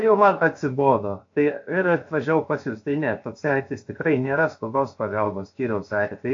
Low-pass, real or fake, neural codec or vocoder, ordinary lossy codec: 7.2 kHz; fake; codec, 16 kHz, 0.7 kbps, FocalCodec; AAC, 48 kbps